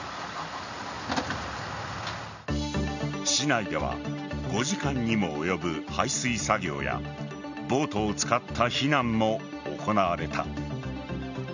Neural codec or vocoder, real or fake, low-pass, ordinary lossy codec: none; real; 7.2 kHz; none